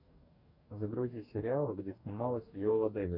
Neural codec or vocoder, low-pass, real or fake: codec, 44.1 kHz, 2.6 kbps, DAC; 5.4 kHz; fake